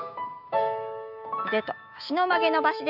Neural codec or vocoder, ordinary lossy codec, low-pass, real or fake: autoencoder, 48 kHz, 128 numbers a frame, DAC-VAE, trained on Japanese speech; none; 5.4 kHz; fake